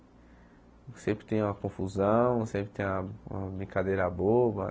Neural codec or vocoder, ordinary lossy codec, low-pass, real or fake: none; none; none; real